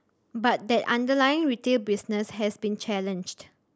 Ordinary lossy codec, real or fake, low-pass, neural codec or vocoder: none; real; none; none